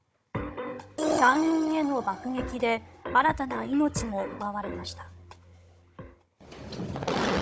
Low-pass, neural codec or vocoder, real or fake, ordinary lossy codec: none; codec, 16 kHz, 16 kbps, FunCodec, trained on Chinese and English, 50 frames a second; fake; none